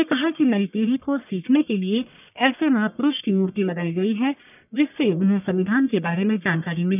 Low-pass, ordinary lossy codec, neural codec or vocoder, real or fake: 3.6 kHz; none; codec, 44.1 kHz, 1.7 kbps, Pupu-Codec; fake